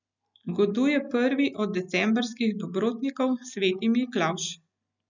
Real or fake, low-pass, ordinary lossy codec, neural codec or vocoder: real; 7.2 kHz; none; none